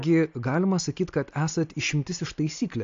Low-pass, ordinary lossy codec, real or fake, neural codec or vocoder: 7.2 kHz; AAC, 48 kbps; real; none